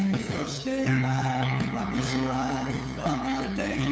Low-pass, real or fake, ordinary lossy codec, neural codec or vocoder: none; fake; none; codec, 16 kHz, 8 kbps, FunCodec, trained on LibriTTS, 25 frames a second